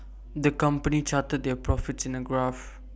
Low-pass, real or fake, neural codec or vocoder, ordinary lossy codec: none; real; none; none